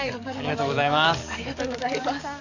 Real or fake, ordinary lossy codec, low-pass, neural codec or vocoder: fake; none; 7.2 kHz; codec, 44.1 kHz, 7.8 kbps, DAC